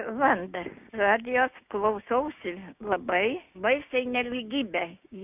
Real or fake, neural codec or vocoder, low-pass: fake; codec, 16 kHz, 6 kbps, DAC; 3.6 kHz